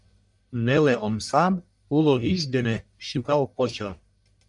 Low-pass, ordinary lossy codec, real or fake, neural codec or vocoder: 10.8 kHz; MP3, 96 kbps; fake; codec, 44.1 kHz, 1.7 kbps, Pupu-Codec